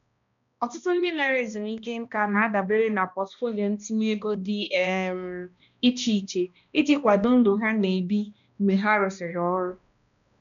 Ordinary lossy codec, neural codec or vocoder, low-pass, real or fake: none; codec, 16 kHz, 1 kbps, X-Codec, HuBERT features, trained on balanced general audio; 7.2 kHz; fake